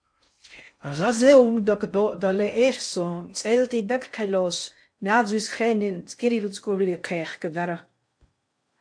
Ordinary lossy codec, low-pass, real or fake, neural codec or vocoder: AAC, 64 kbps; 9.9 kHz; fake; codec, 16 kHz in and 24 kHz out, 0.6 kbps, FocalCodec, streaming, 4096 codes